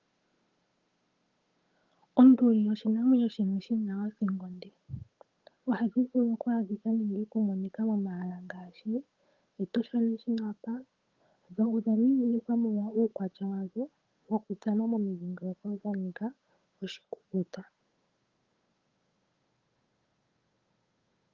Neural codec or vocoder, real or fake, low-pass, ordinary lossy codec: codec, 16 kHz, 8 kbps, FunCodec, trained on Chinese and English, 25 frames a second; fake; 7.2 kHz; Opus, 32 kbps